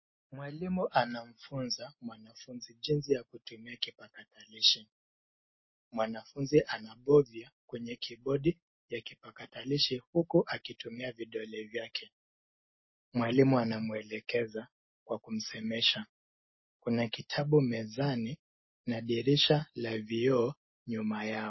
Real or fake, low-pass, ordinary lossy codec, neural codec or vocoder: real; 7.2 kHz; MP3, 24 kbps; none